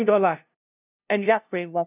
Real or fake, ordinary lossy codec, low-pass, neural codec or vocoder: fake; none; 3.6 kHz; codec, 16 kHz, 0.5 kbps, FunCodec, trained on LibriTTS, 25 frames a second